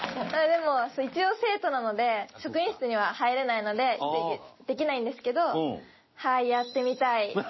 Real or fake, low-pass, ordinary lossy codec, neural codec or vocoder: real; 7.2 kHz; MP3, 24 kbps; none